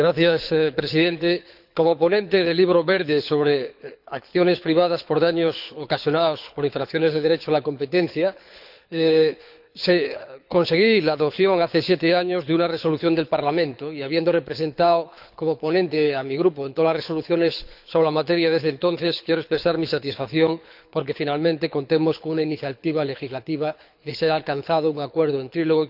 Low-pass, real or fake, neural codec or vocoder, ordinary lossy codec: 5.4 kHz; fake; codec, 24 kHz, 6 kbps, HILCodec; none